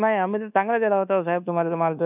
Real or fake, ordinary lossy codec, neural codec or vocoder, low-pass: fake; none; codec, 24 kHz, 1.2 kbps, DualCodec; 3.6 kHz